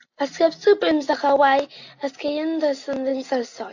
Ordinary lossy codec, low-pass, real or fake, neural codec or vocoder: AAC, 48 kbps; 7.2 kHz; real; none